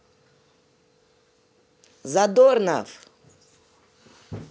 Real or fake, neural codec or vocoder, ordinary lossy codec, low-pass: real; none; none; none